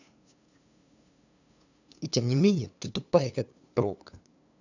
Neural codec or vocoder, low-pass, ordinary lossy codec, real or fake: codec, 16 kHz, 2 kbps, FunCodec, trained on LibriTTS, 25 frames a second; 7.2 kHz; none; fake